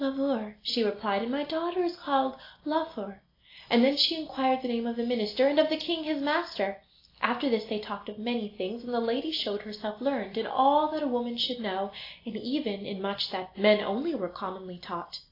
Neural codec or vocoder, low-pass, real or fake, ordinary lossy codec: none; 5.4 kHz; real; AAC, 32 kbps